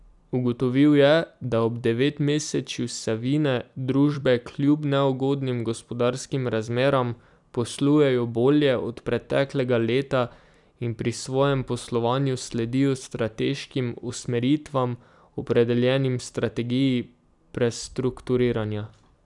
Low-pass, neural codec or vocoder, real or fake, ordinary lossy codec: 10.8 kHz; vocoder, 44.1 kHz, 128 mel bands every 256 samples, BigVGAN v2; fake; none